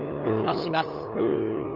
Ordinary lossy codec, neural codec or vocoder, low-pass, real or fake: Opus, 24 kbps; codec, 16 kHz, 8 kbps, FunCodec, trained on LibriTTS, 25 frames a second; 5.4 kHz; fake